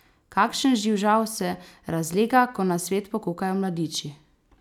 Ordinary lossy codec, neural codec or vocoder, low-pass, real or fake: none; none; 19.8 kHz; real